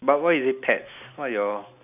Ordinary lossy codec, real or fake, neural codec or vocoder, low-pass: none; real; none; 3.6 kHz